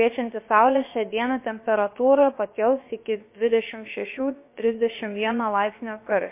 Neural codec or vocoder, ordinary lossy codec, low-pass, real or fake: codec, 16 kHz, about 1 kbps, DyCAST, with the encoder's durations; MP3, 24 kbps; 3.6 kHz; fake